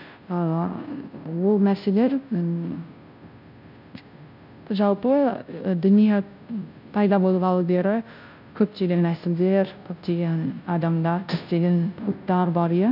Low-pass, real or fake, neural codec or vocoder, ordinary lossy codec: 5.4 kHz; fake; codec, 16 kHz, 0.5 kbps, FunCodec, trained on Chinese and English, 25 frames a second; none